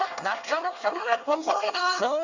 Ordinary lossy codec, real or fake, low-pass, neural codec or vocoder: Opus, 64 kbps; fake; 7.2 kHz; codec, 24 kHz, 1 kbps, SNAC